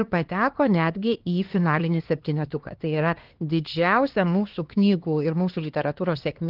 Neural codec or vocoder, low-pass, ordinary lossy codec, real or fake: codec, 16 kHz, 2 kbps, X-Codec, HuBERT features, trained on LibriSpeech; 5.4 kHz; Opus, 16 kbps; fake